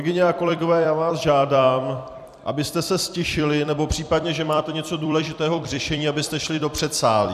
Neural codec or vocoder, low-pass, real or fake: vocoder, 44.1 kHz, 128 mel bands every 256 samples, BigVGAN v2; 14.4 kHz; fake